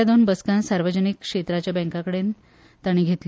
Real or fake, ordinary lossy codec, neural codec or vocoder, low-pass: real; none; none; none